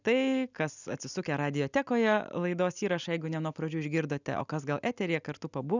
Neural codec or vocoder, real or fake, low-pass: none; real; 7.2 kHz